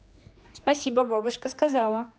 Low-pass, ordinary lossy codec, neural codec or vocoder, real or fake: none; none; codec, 16 kHz, 2 kbps, X-Codec, HuBERT features, trained on general audio; fake